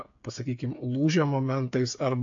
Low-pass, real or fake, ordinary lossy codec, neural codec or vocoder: 7.2 kHz; fake; AAC, 48 kbps; codec, 16 kHz, 8 kbps, FreqCodec, smaller model